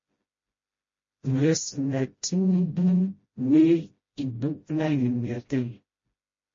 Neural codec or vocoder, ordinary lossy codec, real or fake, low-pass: codec, 16 kHz, 0.5 kbps, FreqCodec, smaller model; MP3, 32 kbps; fake; 7.2 kHz